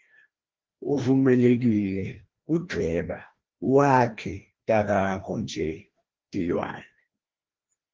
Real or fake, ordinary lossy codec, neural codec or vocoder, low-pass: fake; Opus, 16 kbps; codec, 16 kHz, 1 kbps, FreqCodec, larger model; 7.2 kHz